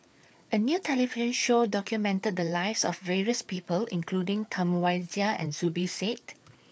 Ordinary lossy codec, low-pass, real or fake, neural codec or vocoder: none; none; fake; codec, 16 kHz, 4 kbps, FreqCodec, larger model